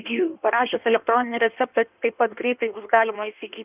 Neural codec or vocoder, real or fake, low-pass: codec, 16 kHz in and 24 kHz out, 1.1 kbps, FireRedTTS-2 codec; fake; 3.6 kHz